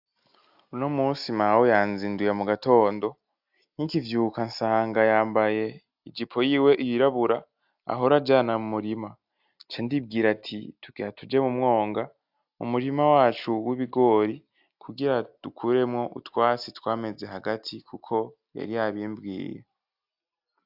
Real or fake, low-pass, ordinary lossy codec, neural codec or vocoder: real; 5.4 kHz; AAC, 48 kbps; none